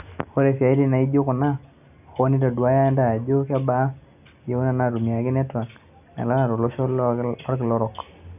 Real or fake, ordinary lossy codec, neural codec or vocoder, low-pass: real; none; none; 3.6 kHz